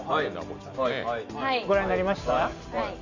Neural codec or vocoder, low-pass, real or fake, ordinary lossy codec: none; 7.2 kHz; real; none